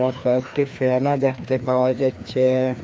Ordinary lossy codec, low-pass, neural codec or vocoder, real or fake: none; none; codec, 16 kHz, 2 kbps, FreqCodec, larger model; fake